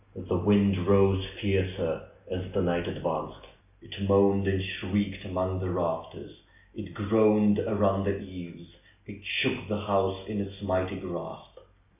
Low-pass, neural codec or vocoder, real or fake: 3.6 kHz; none; real